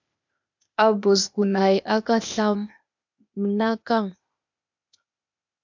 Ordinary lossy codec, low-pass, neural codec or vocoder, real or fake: MP3, 64 kbps; 7.2 kHz; codec, 16 kHz, 0.8 kbps, ZipCodec; fake